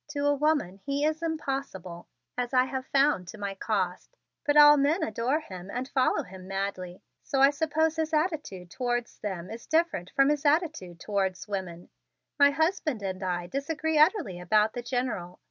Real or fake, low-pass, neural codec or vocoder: real; 7.2 kHz; none